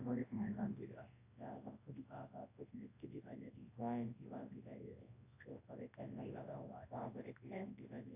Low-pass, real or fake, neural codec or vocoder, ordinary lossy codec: 3.6 kHz; fake; codec, 24 kHz, 0.9 kbps, WavTokenizer, large speech release; AAC, 24 kbps